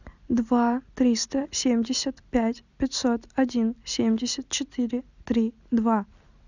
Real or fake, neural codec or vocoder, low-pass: real; none; 7.2 kHz